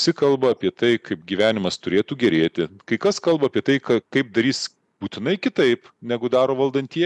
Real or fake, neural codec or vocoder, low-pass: real; none; 10.8 kHz